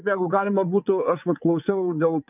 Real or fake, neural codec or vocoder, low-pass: fake; vocoder, 22.05 kHz, 80 mel bands, WaveNeXt; 3.6 kHz